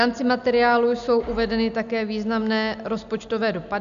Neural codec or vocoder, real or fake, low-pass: none; real; 7.2 kHz